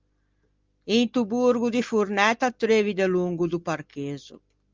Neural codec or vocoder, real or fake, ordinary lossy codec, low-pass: none; real; Opus, 32 kbps; 7.2 kHz